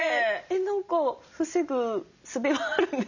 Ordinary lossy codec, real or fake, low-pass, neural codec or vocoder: none; real; 7.2 kHz; none